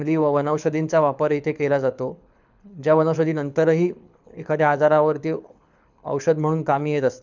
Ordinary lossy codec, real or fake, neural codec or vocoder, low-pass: none; fake; codec, 24 kHz, 6 kbps, HILCodec; 7.2 kHz